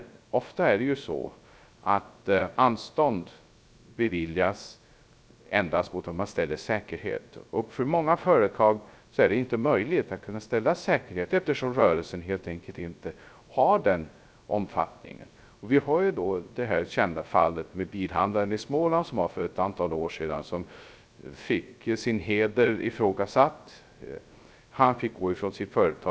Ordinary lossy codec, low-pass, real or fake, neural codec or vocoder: none; none; fake; codec, 16 kHz, 0.3 kbps, FocalCodec